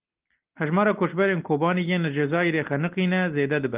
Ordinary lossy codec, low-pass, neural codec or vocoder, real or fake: Opus, 32 kbps; 3.6 kHz; none; real